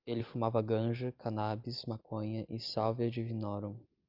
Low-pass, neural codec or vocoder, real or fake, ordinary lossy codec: 5.4 kHz; none; real; Opus, 32 kbps